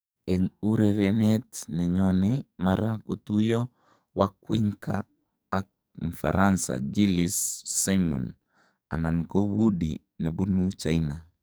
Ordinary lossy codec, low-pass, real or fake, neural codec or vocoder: none; none; fake; codec, 44.1 kHz, 2.6 kbps, SNAC